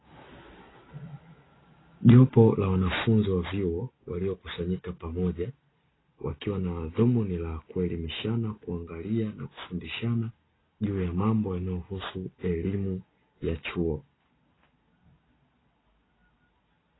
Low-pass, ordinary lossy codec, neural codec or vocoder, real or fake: 7.2 kHz; AAC, 16 kbps; none; real